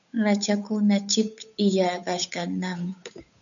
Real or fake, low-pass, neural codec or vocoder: fake; 7.2 kHz; codec, 16 kHz, 8 kbps, FunCodec, trained on Chinese and English, 25 frames a second